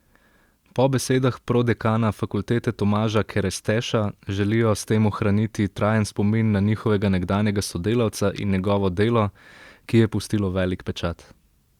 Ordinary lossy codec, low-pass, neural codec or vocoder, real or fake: Opus, 64 kbps; 19.8 kHz; none; real